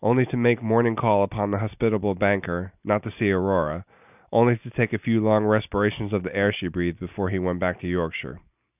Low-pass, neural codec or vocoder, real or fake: 3.6 kHz; none; real